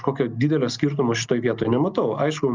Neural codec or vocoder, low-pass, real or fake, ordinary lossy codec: none; 7.2 kHz; real; Opus, 24 kbps